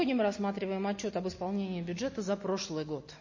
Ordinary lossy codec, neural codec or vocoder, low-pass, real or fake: MP3, 32 kbps; none; 7.2 kHz; real